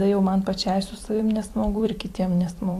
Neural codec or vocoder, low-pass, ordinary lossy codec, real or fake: vocoder, 44.1 kHz, 128 mel bands every 256 samples, BigVGAN v2; 14.4 kHz; AAC, 64 kbps; fake